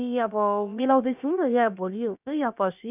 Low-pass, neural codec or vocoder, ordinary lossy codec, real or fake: 3.6 kHz; codec, 16 kHz, about 1 kbps, DyCAST, with the encoder's durations; none; fake